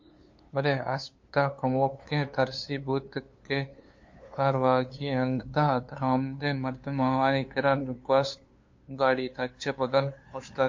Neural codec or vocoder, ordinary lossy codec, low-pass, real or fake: codec, 16 kHz, 2 kbps, FunCodec, trained on LibriTTS, 25 frames a second; MP3, 48 kbps; 7.2 kHz; fake